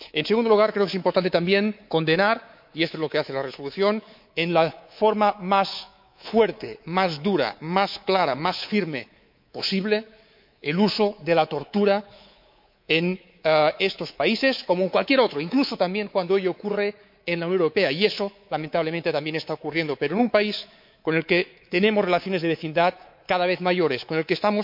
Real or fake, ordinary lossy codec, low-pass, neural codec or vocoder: fake; MP3, 48 kbps; 5.4 kHz; codec, 24 kHz, 3.1 kbps, DualCodec